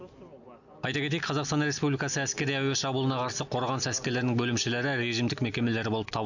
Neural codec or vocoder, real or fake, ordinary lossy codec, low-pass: none; real; none; 7.2 kHz